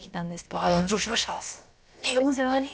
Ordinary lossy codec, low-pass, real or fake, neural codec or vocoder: none; none; fake; codec, 16 kHz, about 1 kbps, DyCAST, with the encoder's durations